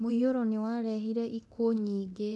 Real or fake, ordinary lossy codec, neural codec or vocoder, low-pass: fake; none; codec, 24 kHz, 0.9 kbps, DualCodec; none